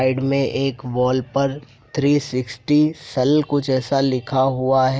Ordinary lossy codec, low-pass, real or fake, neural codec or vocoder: none; none; real; none